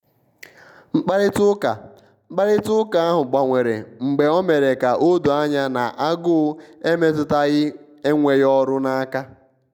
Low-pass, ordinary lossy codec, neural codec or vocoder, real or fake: 19.8 kHz; none; none; real